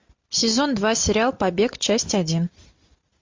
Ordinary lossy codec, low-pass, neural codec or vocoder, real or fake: MP3, 48 kbps; 7.2 kHz; none; real